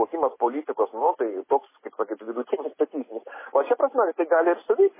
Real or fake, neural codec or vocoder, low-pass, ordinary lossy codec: real; none; 3.6 kHz; MP3, 16 kbps